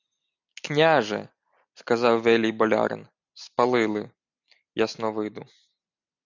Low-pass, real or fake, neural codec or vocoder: 7.2 kHz; real; none